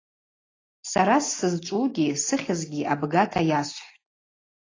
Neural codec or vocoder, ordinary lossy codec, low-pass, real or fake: none; AAC, 32 kbps; 7.2 kHz; real